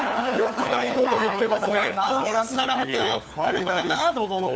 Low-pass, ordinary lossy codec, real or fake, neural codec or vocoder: none; none; fake; codec, 16 kHz, 4 kbps, FunCodec, trained on LibriTTS, 50 frames a second